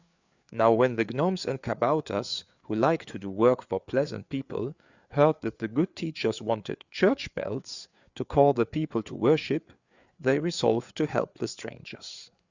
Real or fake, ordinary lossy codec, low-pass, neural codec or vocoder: fake; Opus, 64 kbps; 7.2 kHz; codec, 16 kHz, 4 kbps, FreqCodec, larger model